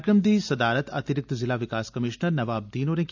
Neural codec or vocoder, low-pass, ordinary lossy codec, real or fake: none; 7.2 kHz; none; real